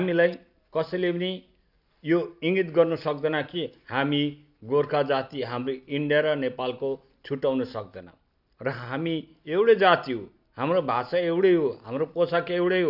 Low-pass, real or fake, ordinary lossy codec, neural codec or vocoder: 5.4 kHz; real; none; none